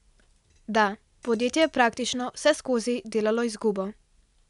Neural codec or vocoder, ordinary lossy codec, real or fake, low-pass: none; none; real; 10.8 kHz